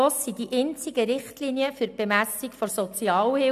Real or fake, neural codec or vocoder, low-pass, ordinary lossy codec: real; none; 14.4 kHz; none